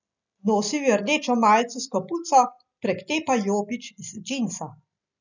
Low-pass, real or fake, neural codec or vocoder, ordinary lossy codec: 7.2 kHz; real; none; none